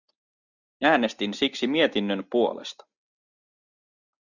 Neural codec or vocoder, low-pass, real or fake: none; 7.2 kHz; real